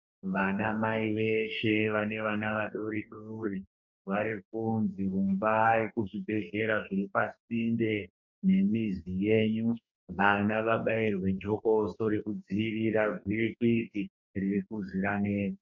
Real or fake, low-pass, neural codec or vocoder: fake; 7.2 kHz; codec, 44.1 kHz, 2.6 kbps, DAC